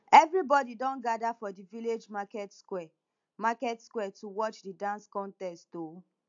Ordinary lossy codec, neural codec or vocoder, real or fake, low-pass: AAC, 64 kbps; none; real; 7.2 kHz